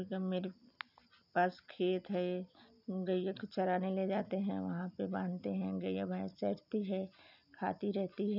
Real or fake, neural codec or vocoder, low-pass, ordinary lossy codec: real; none; 5.4 kHz; none